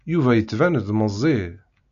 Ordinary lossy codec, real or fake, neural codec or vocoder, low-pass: MP3, 96 kbps; real; none; 7.2 kHz